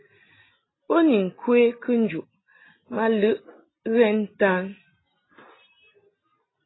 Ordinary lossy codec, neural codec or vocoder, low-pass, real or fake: AAC, 16 kbps; none; 7.2 kHz; real